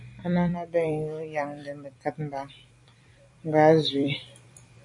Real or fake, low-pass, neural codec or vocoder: fake; 10.8 kHz; vocoder, 44.1 kHz, 128 mel bands every 256 samples, BigVGAN v2